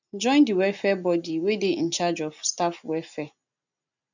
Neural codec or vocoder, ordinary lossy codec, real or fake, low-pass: none; none; real; 7.2 kHz